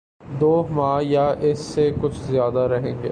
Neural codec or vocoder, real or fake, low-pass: none; real; 9.9 kHz